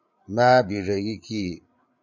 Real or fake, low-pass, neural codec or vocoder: fake; 7.2 kHz; codec, 16 kHz, 8 kbps, FreqCodec, larger model